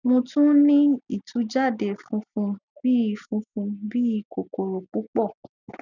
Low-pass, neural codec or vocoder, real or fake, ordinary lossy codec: 7.2 kHz; none; real; none